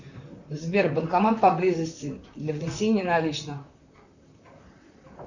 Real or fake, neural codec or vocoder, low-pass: fake; vocoder, 44.1 kHz, 128 mel bands, Pupu-Vocoder; 7.2 kHz